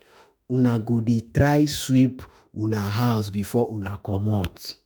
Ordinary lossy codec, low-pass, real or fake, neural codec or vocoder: none; none; fake; autoencoder, 48 kHz, 32 numbers a frame, DAC-VAE, trained on Japanese speech